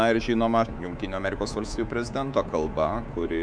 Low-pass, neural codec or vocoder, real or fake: 9.9 kHz; codec, 24 kHz, 3.1 kbps, DualCodec; fake